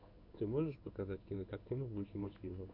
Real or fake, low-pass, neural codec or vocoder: fake; 5.4 kHz; codec, 16 kHz in and 24 kHz out, 1 kbps, XY-Tokenizer